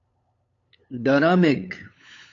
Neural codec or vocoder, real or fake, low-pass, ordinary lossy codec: codec, 16 kHz, 8 kbps, FunCodec, trained on LibriTTS, 25 frames a second; fake; 7.2 kHz; AAC, 48 kbps